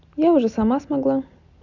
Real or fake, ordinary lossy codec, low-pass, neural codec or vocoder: real; none; 7.2 kHz; none